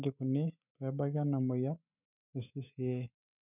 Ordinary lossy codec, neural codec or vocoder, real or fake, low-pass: none; autoencoder, 48 kHz, 128 numbers a frame, DAC-VAE, trained on Japanese speech; fake; 3.6 kHz